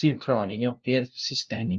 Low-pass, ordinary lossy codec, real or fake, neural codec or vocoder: 7.2 kHz; Opus, 24 kbps; fake; codec, 16 kHz, 0.5 kbps, FunCodec, trained on LibriTTS, 25 frames a second